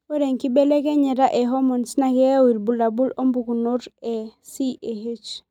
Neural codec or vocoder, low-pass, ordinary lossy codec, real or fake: none; 19.8 kHz; none; real